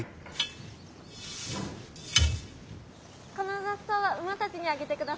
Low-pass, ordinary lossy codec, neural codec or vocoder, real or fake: none; none; none; real